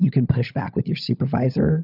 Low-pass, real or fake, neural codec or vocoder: 5.4 kHz; fake; codec, 16 kHz, 16 kbps, FunCodec, trained on LibriTTS, 50 frames a second